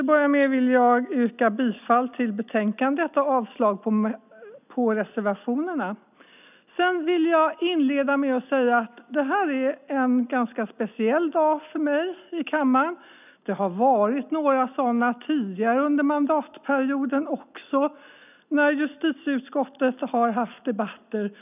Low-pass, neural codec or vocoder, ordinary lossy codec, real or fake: 3.6 kHz; none; none; real